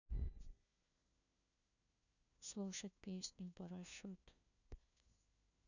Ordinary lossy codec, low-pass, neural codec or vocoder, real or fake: none; 7.2 kHz; codec, 16 kHz, 1 kbps, FunCodec, trained on LibriTTS, 50 frames a second; fake